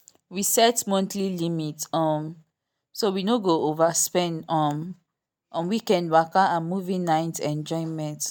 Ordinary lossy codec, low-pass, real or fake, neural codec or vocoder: none; none; real; none